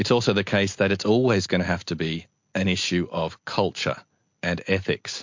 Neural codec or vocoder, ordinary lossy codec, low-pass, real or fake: none; MP3, 48 kbps; 7.2 kHz; real